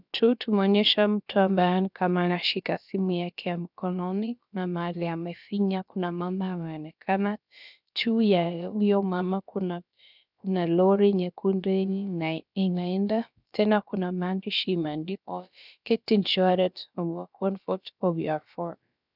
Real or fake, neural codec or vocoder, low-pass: fake; codec, 16 kHz, about 1 kbps, DyCAST, with the encoder's durations; 5.4 kHz